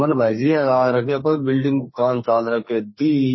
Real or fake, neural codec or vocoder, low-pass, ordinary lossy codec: fake; codec, 32 kHz, 1.9 kbps, SNAC; 7.2 kHz; MP3, 24 kbps